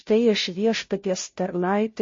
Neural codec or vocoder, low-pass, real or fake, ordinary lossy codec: codec, 16 kHz, 0.5 kbps, FunCodec, trained on Chinese and English, 25 frames a second; 7.2 kHz; fake; MP3, 32 kbps